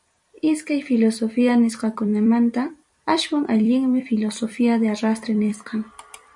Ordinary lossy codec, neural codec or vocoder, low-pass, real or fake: MP3, 96 kbps; vocoder, 44.1 kHz, 128 mel bands every 256 samples, BigVGAN v2; 10.8 kHz; fake